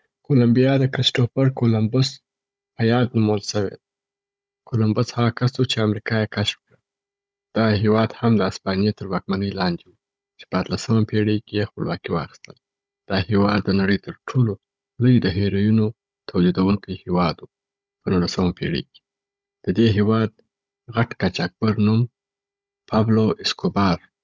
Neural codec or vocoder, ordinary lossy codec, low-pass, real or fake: codec, 16 kHz, 16 kbps, FunCodec, trained on Chinese and English, 50 frames a second; none; none; fake